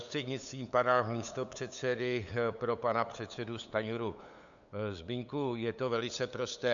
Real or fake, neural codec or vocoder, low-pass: fake; codec, 16 kHz, 8 kbps, FunCodec, trained on LibriTTS, 25 frames a second; 7.2 kHz